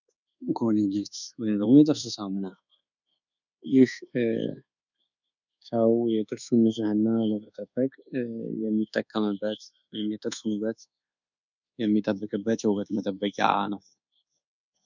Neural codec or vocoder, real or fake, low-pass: codec, 24 kHz, 1.2 kbps, DualCodec; fake; 7.2 kHz